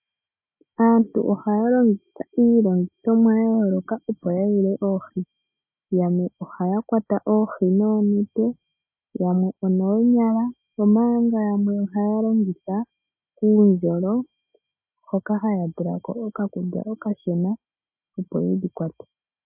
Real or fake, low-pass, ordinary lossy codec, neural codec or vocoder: real; 3.6 kHz; MP3, 24 kbps; none